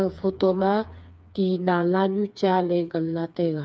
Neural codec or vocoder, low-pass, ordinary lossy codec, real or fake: codec, 16 kHz, 4 kbps, FreqCodec, smaller model; none; none; fake